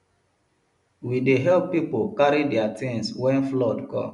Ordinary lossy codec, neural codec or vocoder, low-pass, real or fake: none; none; 10.8 kHz; real